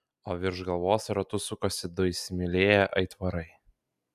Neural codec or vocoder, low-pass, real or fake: none; 14.4 kHz; real